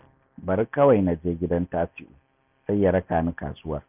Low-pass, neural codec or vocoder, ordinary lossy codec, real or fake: 3.6 kHz; none; none; real